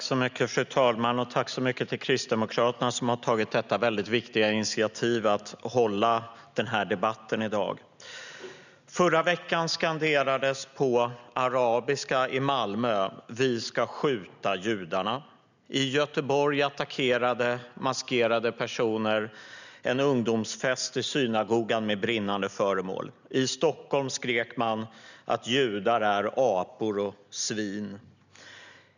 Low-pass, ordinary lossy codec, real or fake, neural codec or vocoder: 7.2 kHz; none; real; none